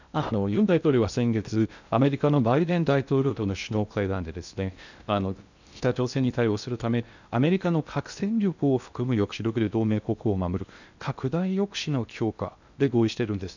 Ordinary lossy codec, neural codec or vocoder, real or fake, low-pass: none; codec, 16 kHz in and 24 kHz out, 0.6 kbps, FocalCodec, streaming, 2048 codes; fake; 7.2 kHz